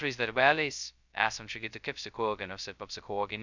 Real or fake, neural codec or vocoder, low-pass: fake; codec, 16 kHz, 0.2 kbps, FocalCodec; 7.2 kHz